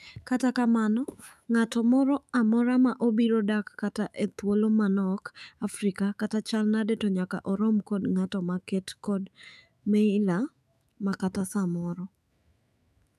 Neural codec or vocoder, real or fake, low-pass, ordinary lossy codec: autoencoder, 48 kHz, 128 numbers a frame, DAC-VAE, trained on Japanese speech; fake; 14.4 kHz; none